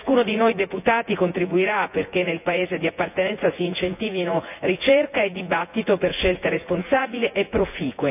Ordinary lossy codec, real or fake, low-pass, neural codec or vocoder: none; fake; 3.6 kHz; vocoder, 24 kHz, 100 mel bands, Vocos